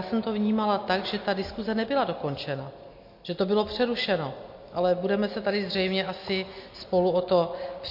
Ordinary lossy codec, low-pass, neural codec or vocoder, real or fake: MP3, 32 kbps; 5.4 kHz; none; real